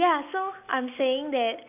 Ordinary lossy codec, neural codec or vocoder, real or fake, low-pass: none; none; real; 3.6 kHz